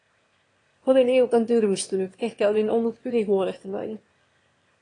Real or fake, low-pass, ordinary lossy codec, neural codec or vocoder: fake; 9.9 kHz; AAC, 32 kbps; autoencoder, 22.05 kHz, a latent of 192 numbers a frame, VITS, trained on one speaker